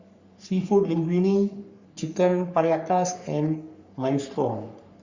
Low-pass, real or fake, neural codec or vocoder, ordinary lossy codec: 7.2 kHz; fake; codec, 44.1 kHz, 3.4 kbps, Pupu-Codec; Opus, 64 kbps